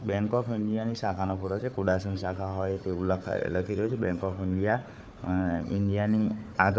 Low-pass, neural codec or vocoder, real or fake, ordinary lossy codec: none; codec, 16 kHz, 4 kbps, FunCodec, trained on Chinese and English, 50 frames a second; fake; none